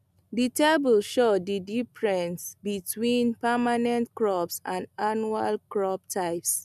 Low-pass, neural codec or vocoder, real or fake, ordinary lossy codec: 14.4 kHz; none; real; none